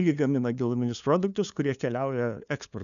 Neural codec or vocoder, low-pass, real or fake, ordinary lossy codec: codec, 16 kHz, 2 kbps, FunCodec, trained on LibriTTS, 25 frames a second; 7.2 kHz; fake; MP3, 96 kbps